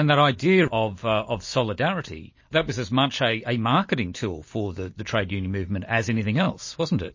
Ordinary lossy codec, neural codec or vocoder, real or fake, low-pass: MP3, 32 kbps; none; real; 7.2 kHz